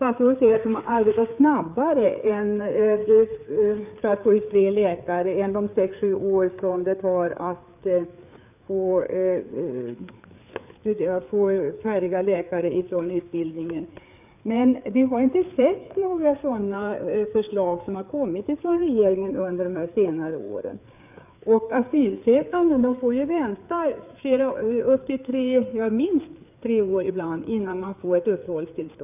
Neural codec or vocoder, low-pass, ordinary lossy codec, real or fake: codec, 16 kHz, 4 kbps, FreqCodec, larger model; 3.6 kHz; none; fake